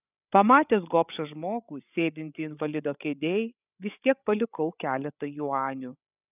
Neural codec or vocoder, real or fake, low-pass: codec, 16 kHz, 8 kbps, FreqCodec, larger model; fake; 3.6 kHz